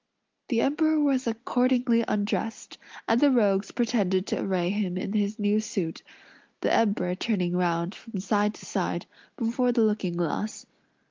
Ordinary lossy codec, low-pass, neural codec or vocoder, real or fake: Opus, 32 kbps; 7.2 kHz; none; real